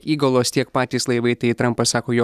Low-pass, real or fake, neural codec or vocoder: 14.4 kHz; real; none